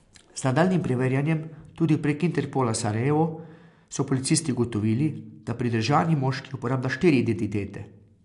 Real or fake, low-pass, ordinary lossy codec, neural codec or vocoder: real; 10.8 kHz; AAC, 64 kbps; none